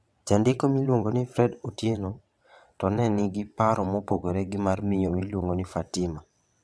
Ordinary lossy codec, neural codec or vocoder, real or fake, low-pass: none; vocoder, 22.05 kHz, 80 mel bands, WaveNeXt; fake; none